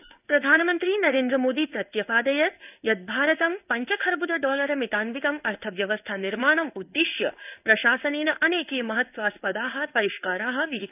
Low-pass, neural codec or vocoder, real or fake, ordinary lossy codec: 3.6 kHz; codec, 16 kHz in and 24 kHz out, 1 kbps, XY-Tokenizer; fake; none